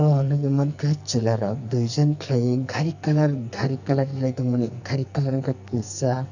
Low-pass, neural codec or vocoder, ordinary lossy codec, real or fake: 7.2 kHz; codec, 44.1 kHz, 2.6 kbps, SNAC; none; fake